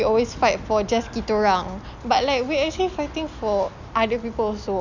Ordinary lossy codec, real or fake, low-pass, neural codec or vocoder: none; real; 7.2 kHz; none